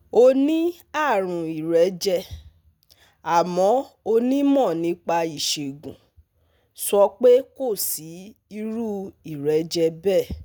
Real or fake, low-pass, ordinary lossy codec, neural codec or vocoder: real; none; none; none